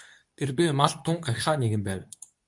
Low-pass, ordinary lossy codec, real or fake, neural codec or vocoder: 10.8 kHz; MP3, 96 kbps; fake; codec, 24 kHz, 0.9 kbps, WavTokenizer, medium speech release version 2